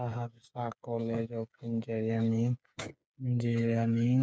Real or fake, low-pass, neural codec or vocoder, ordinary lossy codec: fake; none; codec, 16 kHz, 8 kbps, FreqCodec, smaller model; none